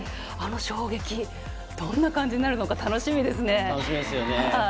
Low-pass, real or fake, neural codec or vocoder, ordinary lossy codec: none; real; none; none